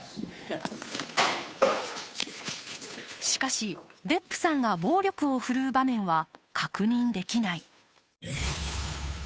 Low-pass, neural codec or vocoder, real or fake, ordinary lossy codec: none; codec, 16 kHz, 2 kbps, FunCodec, trained on Chinese and English, 25 frames a second; fake; none